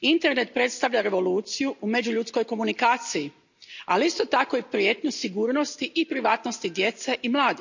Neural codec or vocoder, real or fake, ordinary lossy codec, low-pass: none; real; none; 7.2 kHz